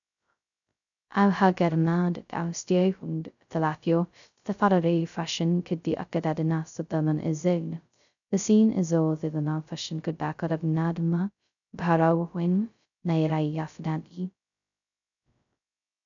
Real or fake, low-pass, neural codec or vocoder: fake; 7.2 kHz; codec, 16 kHz, 0.2 kbps, FocalCodec